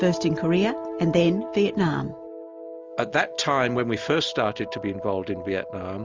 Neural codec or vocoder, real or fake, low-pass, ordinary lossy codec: none; real; 7.2 kHz; Opus, 32 kbps